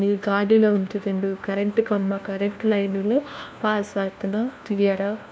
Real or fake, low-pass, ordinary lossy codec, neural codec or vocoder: fake; none; none; codec, 16 kHz, 1 kbps, FunCodec, trained on LibriTTS, 50 frames a second